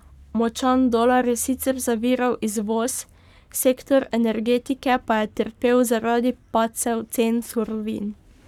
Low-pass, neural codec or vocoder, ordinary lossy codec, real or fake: 19.8 kHz; codec, 44.1 kHz, 7.8 kbps, Pupu-Codec; none; fake